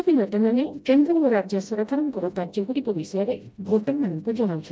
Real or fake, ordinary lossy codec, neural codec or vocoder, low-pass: fake; none; codec, 16 kHz, 0.5 kbps, FreqCodec, smaller model; none